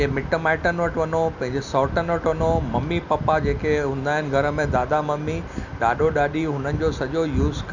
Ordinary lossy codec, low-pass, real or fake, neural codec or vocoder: none; 7.2 kHz; real; none